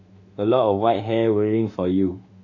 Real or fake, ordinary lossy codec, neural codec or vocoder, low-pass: fake; AAC, 48 kbps; autoencoder, 48 kHz, 32 numbers a frame, DAC-VAE, trained on Japanese speech; 7.2 kHz